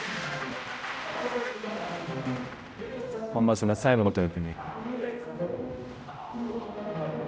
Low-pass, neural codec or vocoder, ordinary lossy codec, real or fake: none; codec, 16 kHz, 0.5 kbps, X-Codec, HuBERT features, trained on general audio; none; fake